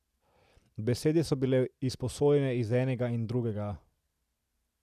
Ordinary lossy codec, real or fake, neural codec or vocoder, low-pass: none; real; none; 14.4 kHz